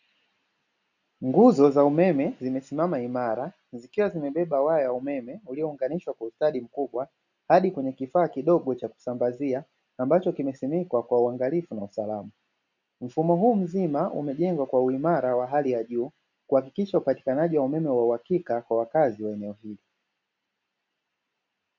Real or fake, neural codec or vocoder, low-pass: real; none; 7.2 kHz